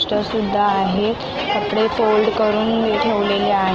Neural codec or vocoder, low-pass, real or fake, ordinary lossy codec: none; 7.2 kHz; real; Opus, 16 kbps